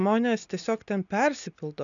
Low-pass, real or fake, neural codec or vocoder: 7.2 kHz; real; none